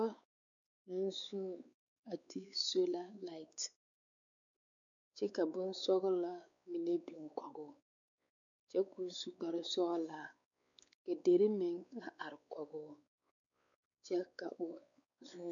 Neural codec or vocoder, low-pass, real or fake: codec, 16 kHz, 4 kbps, X-Codec, WavLM features, trained on Multilingual LibriSpeech; 7.2 kHz; fake